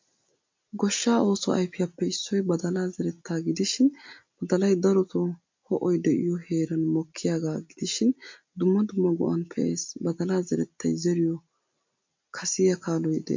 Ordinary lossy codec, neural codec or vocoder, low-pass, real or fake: MP3, 48 kbps; none; 7.2 kHz; real